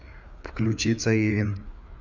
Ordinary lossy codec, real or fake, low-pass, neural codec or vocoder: none; fake; 7.2 kHz; codec, 16 kHz, 4 kbps, FreqCodec, larger model